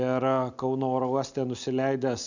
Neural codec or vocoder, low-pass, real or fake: none; 7.2 kHz; real